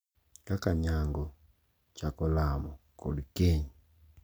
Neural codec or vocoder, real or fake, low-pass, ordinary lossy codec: none; real; none; none